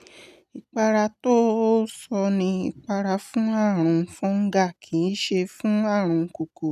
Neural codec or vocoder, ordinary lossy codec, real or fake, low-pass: none; none; real; 14.4 kHz